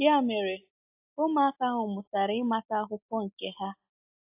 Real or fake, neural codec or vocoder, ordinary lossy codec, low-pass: real; none; AAC, 32 kbps; 3.6 kHz